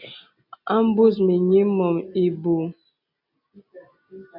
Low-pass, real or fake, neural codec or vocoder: 5.4 kHz; real; none